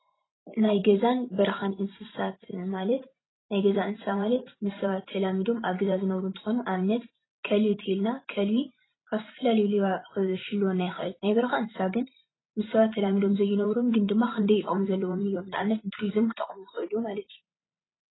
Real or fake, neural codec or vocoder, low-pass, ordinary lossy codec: fake; vocoder, 44.1 kHz, 128 mel bands every 512 samples, BigVGAN v2; 7.2 kHz; AAC, 16 kbps